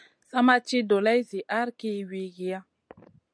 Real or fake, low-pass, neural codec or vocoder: real; 9.9 kHz; none